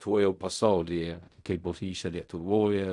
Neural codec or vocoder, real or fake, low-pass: codec, 16 kHz in and 24 kHz out, 0.4 kbps, LongCat-Audio-Codec, fine tuned four codebook decoder; fake; 10.8 kHz